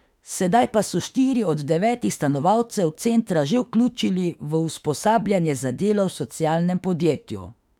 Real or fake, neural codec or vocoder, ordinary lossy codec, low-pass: fake; autoencoder, 48 kHz, 32 numbers a frame, DAC-VAE, trained on Japanese speech; none; 19.8 kHz